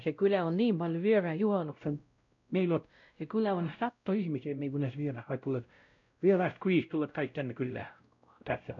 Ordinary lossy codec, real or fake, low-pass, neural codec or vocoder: none; fake; 7.2 kHz; codec, 16 kHz, 0.5 kbps, X-Codec, WavLM features, trained on Multilingual LibriSpeech